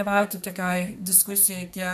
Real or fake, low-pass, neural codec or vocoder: fake; 14.4 kHz; codec, 32 kHz, 1.9 kbps, SNAC